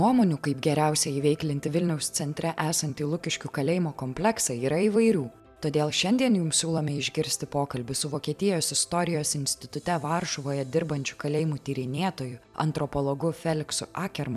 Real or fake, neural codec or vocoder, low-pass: fake; vocoder, 44.1 kHz, 128 mel bands every 256 samples, BigVGAN v2; 14.4 kHz